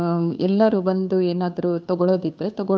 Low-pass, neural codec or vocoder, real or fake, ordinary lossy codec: none; codec, 16 kHz, 2 kbps, FunCodec, trained on Chinese and English, 25 frames a second; fake; none